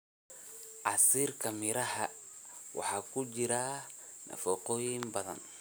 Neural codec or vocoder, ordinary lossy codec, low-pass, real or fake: none; none; none; real